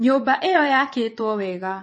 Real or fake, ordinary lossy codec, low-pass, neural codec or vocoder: fake; MP3, 32 kbps; 9.9 kHz; vocoder, 22.05 kHz, 80 mel bands, WaveNeXt